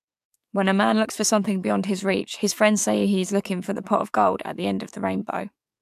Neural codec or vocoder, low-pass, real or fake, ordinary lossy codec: codec, 44.1 kHz, 7.8 kbps, DAC; 14.4 kHz; fake; AAC, 96 kbps